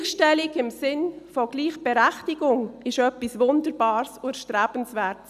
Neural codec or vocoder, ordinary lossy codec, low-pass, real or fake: none; none; 14.4 kHz; real